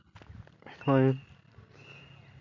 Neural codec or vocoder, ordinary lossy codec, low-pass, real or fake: none; MP3, 48 kbps; 7.2 kHz; real